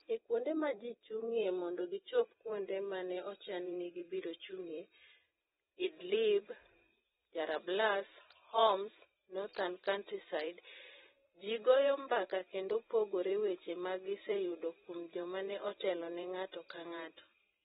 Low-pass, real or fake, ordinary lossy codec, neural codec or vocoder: 19.8 kHz; fake; AAC, 16 kbps; vocoder, 44.1 kHz, 128 mel bands every 512 samples, BigVGAN v2